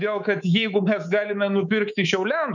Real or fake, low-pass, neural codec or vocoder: fake; 7.2 kHz; codec, 24 kHz, 3.1 kbps, DualCodec